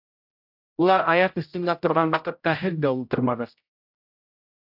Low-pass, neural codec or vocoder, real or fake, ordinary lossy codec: 5.4 kHz; codec, 16 kHz, 0.5 kbps, X-Codec, HuBERT features, trained on general audio; fake; AAC, 48 kbps